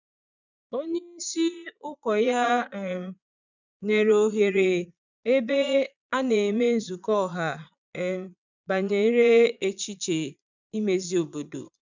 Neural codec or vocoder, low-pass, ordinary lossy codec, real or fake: vocoder, 22.05 kHz, 80 mel bands, Vocos; 7.2 kHz; none; fake